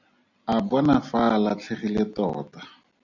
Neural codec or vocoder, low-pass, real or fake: none; 7.2 kHz; real